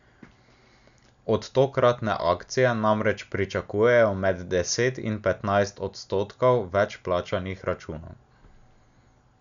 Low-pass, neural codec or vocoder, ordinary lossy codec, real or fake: 7.2 kHz; none; none; real